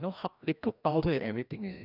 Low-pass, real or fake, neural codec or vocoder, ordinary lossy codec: 5.4 kHz; fake; codec, 16 kHz, 1 kbps, FreqCodec, larger model; none